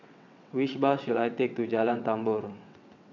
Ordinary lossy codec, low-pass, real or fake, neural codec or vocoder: none; 7.2 kHz; fake; vocoder, 22.05 kHz, 80 mel bands, WaveNeXt